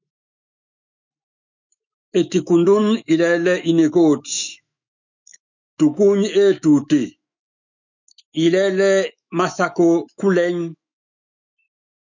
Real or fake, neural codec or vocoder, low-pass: fake; autoencoder, 48 kHz, 128 numbers a frame, DAC-VAE, trained on Japanese speech; 7.2 kHz